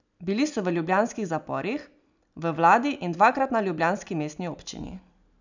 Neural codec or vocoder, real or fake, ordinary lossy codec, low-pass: none; real; none; 7.2 kHz